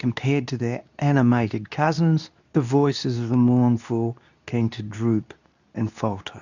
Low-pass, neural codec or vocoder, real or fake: 7.2 kHz; codec, 24 kHz, 0.9 kbps, WavTokenizer, medium speech release version 2; fake